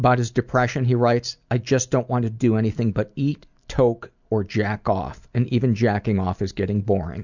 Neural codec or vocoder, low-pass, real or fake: none; 7.2 kHz; real